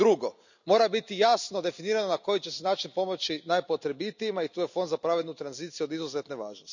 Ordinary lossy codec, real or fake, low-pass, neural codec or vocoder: none; real; 7.2 kHz; none